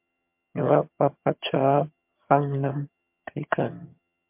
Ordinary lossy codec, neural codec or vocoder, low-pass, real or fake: MP3, 32 kbps; vocoder, 22.05 kHz, 80 mel bands, HiFi-GAN; 3.6 kHz; fake